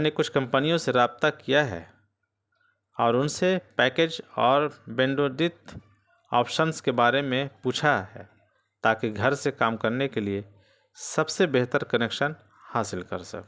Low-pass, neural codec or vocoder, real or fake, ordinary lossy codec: none; none; real; none